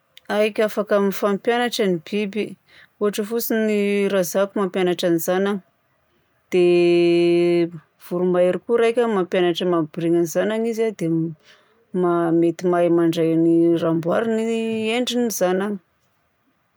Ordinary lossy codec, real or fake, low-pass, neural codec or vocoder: none; real; none; none